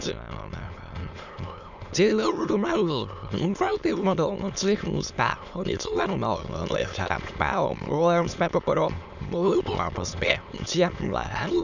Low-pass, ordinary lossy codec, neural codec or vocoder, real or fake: 7.2 kHz; none; autoencoder, 22.05 kHz, a latent of 192 numbers a frame, VITS, trained on many speakers; fake